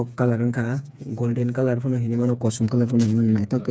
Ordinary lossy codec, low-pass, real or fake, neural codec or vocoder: none; none; fake; codec, 16 kHz, 4 kbps, FreqCodec, smaller model